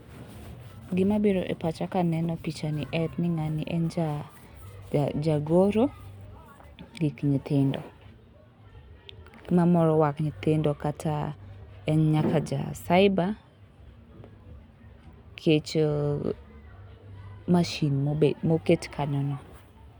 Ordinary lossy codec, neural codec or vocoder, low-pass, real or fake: none; none; 19.8 kHz; real